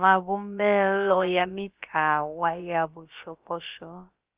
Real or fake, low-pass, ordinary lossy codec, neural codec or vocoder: fake; 3.6 kHz; Opus, 32 kbps; codec, 16 kHz, about 1 kbps, DyCAST, with the encoder's durations